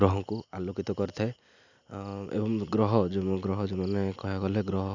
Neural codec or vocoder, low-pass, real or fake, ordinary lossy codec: none; 7.2 kHz; real; none